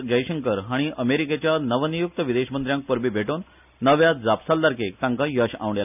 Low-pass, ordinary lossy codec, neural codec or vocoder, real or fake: 3.6 kHz; none; none; real